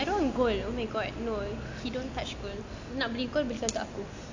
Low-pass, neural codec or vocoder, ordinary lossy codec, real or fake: 7.2 kHz; none; AAC, 48 kbps; real